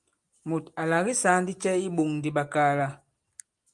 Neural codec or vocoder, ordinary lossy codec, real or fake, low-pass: none; Opus, 32 kbps; real; 10.8 kHz